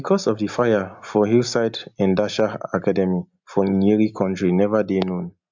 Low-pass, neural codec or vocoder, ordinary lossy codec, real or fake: 7.2 kHz; none; MP3, 64 kbps; real